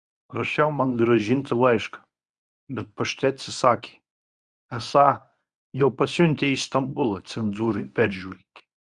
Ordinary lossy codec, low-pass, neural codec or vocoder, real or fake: Opus, 64 kbps; 10.8 kHz; codec, 24 kHz, 0.9 kbps, WavTokenizer, medium speech release version 1; fake